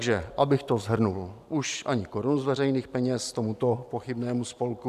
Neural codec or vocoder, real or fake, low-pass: none; real; 14.4 kHz